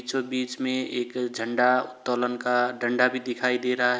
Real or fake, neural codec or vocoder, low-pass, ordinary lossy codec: real; none; none; none